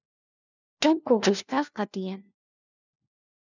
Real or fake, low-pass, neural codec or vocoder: fake; 7.2 kHz; codec, 16 kHz, 1 kbps, FunCodec, trained on LibriTTS, 50 frames a second